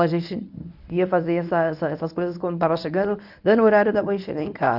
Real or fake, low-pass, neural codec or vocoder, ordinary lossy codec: fake; 5.4 kHz; codec, 24 kHz, 0.9 kbps, WavTokenizer, medium speech release version 1; none